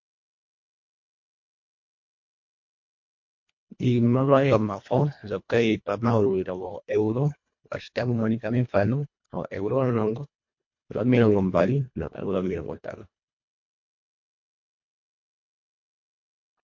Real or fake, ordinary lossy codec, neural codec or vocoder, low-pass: fake; MP3, 48 kbps; codec, 24 kHz, 1.5 kbps, HILCodec; 7.2 kHz